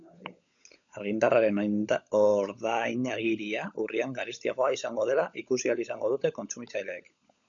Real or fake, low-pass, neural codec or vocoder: fake; 7.2 kHz; codec, 16 kHz, 16 kbps, FunCodec, trained on LibriTTS, 50 frames a second